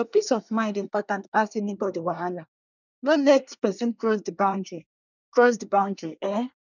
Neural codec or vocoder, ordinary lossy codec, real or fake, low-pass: codec, 24 kHz, 1 kbps, SNAC; none; fake; 7.2 kHz